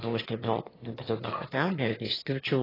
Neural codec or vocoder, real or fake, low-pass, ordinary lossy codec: autoencoder, 22.05 kHz, a latent of 192 numbers a frame, VITS, trained on one speaker; fake; 5.4 kHz; AAC, 24 kbps